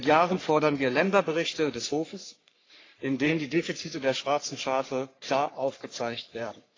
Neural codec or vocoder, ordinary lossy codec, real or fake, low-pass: codec, 44.1 kHz, 3.4 kbps, Pupu-Codec; AAC, 32 kbps; fake; 7.2 kHz